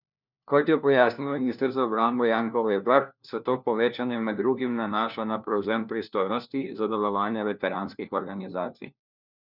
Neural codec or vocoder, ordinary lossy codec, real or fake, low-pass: codec, 16 kHz, 1 kbps, FunCodec, trained on LibriTTS, 50 frames a second; none; fake; 5.4 kHz